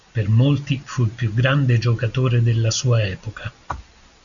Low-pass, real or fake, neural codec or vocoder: 7.2 kHz; real; none